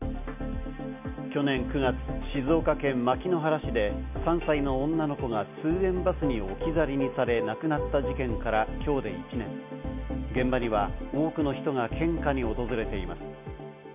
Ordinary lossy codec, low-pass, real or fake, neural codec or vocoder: none; 3.6 kHz; real; none